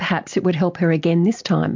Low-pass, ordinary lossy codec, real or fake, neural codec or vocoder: 7.2 kHz; MP3, 64 kbps; real; none